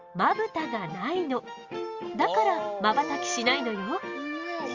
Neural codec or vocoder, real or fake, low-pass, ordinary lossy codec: none; real; 7.2 kHz; Opus, 32 kbps